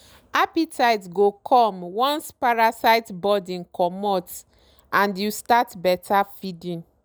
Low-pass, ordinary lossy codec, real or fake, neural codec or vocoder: none; none; real; none